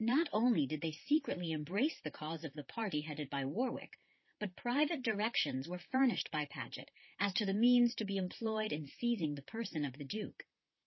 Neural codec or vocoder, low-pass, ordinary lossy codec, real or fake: vocoder, 44.1 kHz, 128 mel bands, Pupu-Vocoder; 7.2 kHz; MP3, 24 kbps; fake